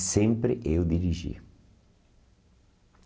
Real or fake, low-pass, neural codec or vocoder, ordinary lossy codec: real; none; none; none